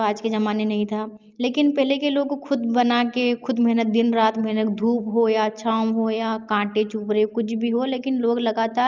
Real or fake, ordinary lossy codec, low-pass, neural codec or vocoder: real; Opus, 32 kbps; 7.2 kHz; none